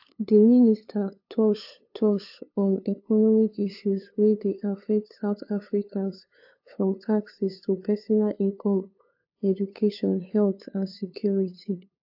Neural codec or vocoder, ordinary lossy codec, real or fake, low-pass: codec, 16 kHz, 2 kbps, FunCodec, trained on LibriTTS, 25 frames a second; AAC, 48 kbps; fake; 5.4 kHz